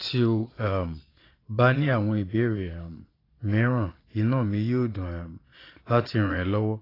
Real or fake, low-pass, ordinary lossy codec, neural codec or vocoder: fake; 5.4 kHz; AAC, 24 kbps; vocoder, 22.05 kHz, 80 mel bands, Vocos